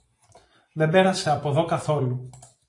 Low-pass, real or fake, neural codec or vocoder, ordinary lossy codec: 10.8 kHz; fake; vocoder, 44.1 kHz, 128 mel bands every 256 samples, BigVGAN v2; AAC, 48 kbps